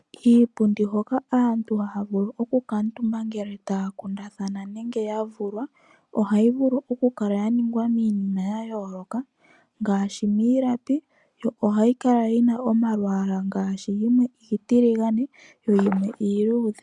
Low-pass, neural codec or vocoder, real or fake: 10.8 kHz; none; real